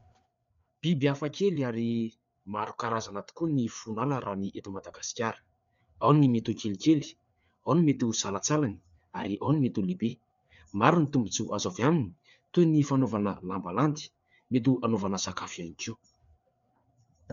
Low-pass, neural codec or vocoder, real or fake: 7.2 kHz; codec, 16 kHz, 4 kbps, FreqCodec, larger model; fake